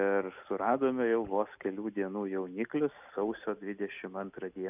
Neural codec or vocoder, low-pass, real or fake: none; 3.6 kHz; real